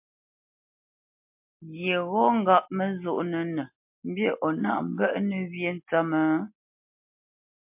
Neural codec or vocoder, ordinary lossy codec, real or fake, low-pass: none; MP3, 32 kbps; real; 3.6 kHz